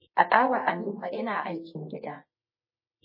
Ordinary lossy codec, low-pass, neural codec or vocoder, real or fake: MP3, 24 kbps; 5.4 kHz; codec, 24 kHz, 0.9 kbps, WavTokenizer, medium music audio release; fake